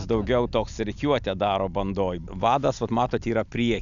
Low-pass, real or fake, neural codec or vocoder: 7.2 kHz; real; none